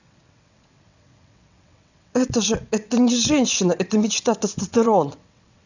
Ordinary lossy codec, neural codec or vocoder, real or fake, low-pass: none; vocoder, 22.05 kHz, 80 mel bands, WaveNeXt; fake; 7.2 kHz